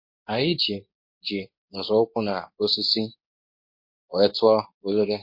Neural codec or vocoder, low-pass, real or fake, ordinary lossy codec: codec, 24 kHz, 0.9 kbps, WavTokenizer, medium speech release version 2; 5.4 kHz; fake; MP3, 32 kbps